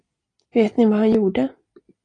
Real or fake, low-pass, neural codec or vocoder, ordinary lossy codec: real; 9.9 kHz; none; AAC, 32 kbps